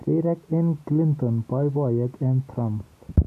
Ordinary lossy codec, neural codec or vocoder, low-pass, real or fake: AAC, 64 kbps; none; 14.4 kHz; real